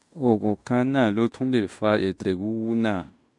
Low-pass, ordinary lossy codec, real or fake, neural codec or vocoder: 10.8 kHz; MP3, 64 kbps; fake; codec, 16 kHz in and 24 kHz out, 0.9 kbps, LongCat-Audio-Codec, fine tuned four codebook decoder